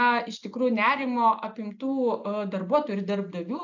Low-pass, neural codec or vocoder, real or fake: 7.2 kHz; none; real